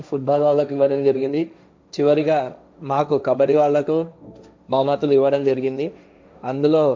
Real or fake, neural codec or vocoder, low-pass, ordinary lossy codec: fake; codec, 16 kHz, 1.1 kbps, Voila-Tokenizer; none; none